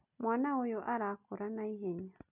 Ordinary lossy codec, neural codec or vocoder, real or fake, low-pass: none; none; real; 3.6 kHz